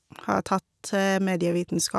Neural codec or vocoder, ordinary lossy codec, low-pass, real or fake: none; none; none; real